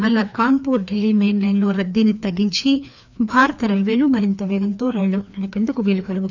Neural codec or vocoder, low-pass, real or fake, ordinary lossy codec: codec, 16 kHz, 2 kbps, FreqCodec, larger model; 7.2 kHz; fake; none